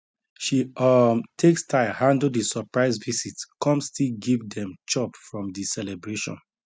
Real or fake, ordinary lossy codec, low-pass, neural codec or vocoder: real; none; none; none